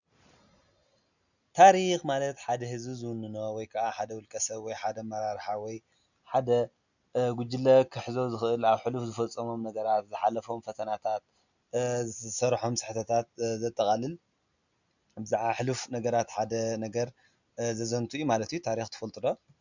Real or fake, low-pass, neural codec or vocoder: real; 7.2 kHz; none